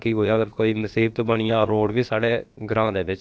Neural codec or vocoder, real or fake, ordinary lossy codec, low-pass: codec, 16 kHz, about 1 kbps, DyCAST, with the encoder's durations; fake; none; none